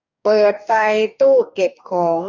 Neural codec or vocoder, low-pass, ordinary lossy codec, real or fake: codec, 44.1 kHz, 2.6 kbps, DAC; 7.2 kHz; none; fake